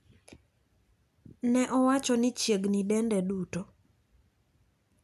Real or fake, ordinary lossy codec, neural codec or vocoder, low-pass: real; none; none; 14.4 kHz